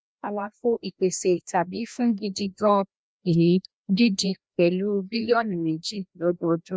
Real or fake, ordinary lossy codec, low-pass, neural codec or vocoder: fake; none; none; codec, 16 kHz, 1 kbps, FreqCodec, larger model